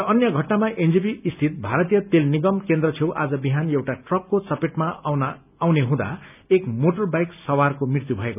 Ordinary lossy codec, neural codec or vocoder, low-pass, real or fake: none; none; 3.6 kHz; real